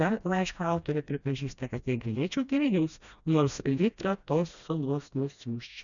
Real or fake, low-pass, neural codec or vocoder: fake; 7.2 kHz; codec, 16 kHz, 1 kbps, FreqCodec, smaller model